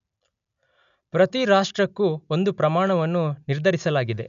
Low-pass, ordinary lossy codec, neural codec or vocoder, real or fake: 7.2 kHz; none; none; real